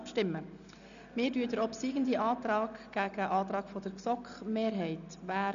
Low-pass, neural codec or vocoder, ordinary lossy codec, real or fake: 7.2 kHz; none; none; real